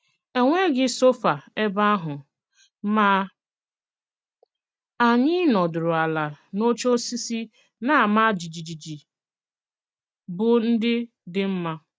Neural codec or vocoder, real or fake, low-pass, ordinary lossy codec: none; real; none; none